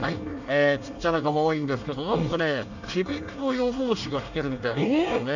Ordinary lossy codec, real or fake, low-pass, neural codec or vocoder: none; fake; 7.2 kHz; codec, 24 kHz, 1 kbps, SNAC